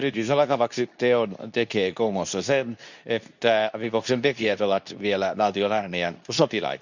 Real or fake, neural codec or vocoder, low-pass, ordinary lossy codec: fake; codec, 24 kHz, 0.9 kbps, WavTokenizer, medium speech release version 2; 7.2 kHz; none